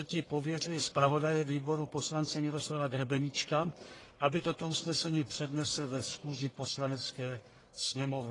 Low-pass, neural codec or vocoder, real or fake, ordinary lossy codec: 10.8 kHz; codec, 44.1 kHz, 1.7 kbps, Pupu-Codec; fake; AAC, 32 kbps